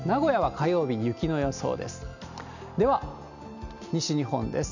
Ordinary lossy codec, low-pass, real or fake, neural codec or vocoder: none; 7.2 kHz; real; none